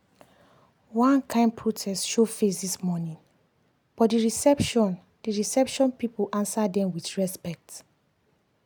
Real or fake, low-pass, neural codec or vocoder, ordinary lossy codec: real; none; none; none